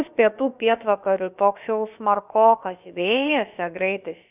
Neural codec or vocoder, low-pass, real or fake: codec, 16 kHz, about 1 kbps, DyCAST, with the encoder's durations; 3.6 kHz; fake